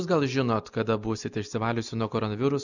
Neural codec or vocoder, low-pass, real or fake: none; 7.2 kHz; real